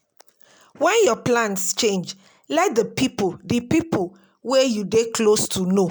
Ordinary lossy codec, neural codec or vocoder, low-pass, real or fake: none; none; none; real